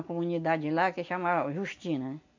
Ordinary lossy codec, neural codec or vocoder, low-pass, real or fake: MP3, 64 kbps; none; 7.2 kHz; real